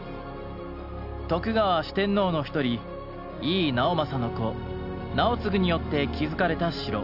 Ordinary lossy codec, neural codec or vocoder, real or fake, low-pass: none; none; real; 5.4 kHz